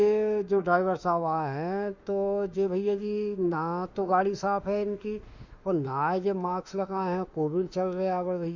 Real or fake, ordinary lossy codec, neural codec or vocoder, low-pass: fake; Opus, 64 kbps; autoencoder, 48 kHz, 32 numbers a frame, DAC-VAE, trained on Japanese speech; 7.2 kHz